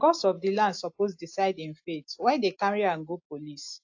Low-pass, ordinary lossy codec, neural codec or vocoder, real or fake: 7.2 kHz; AAC, 48 kbps; none; real